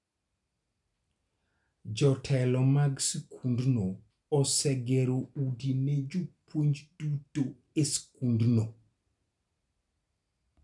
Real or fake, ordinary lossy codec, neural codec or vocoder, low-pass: real; none; none; 10.8 kHz